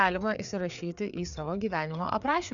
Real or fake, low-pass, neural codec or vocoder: fake; 7.2 kHz; codec, 16 kHz, 4 kbps, FreqCodec, larger model